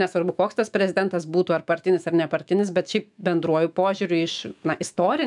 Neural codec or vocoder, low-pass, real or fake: autoencoder, 48 kHz, 128 numbers a frame, DAC-VAE, trained on Japanese speech; 10.8 kHz; fake